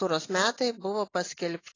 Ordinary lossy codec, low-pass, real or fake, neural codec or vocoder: AAC, 32 kbps; 7.2 kHz; real; none